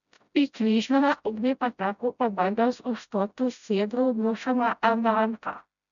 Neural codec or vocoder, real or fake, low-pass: codec, 16 kHz, 0.5 kbps, FreqCodec, smaller model; fake; 7.2 kHz